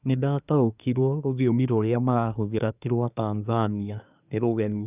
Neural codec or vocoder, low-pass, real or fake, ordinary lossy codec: codec, 24 kHz, 1 kbps, SNAC; 3.6 kHz; fake; none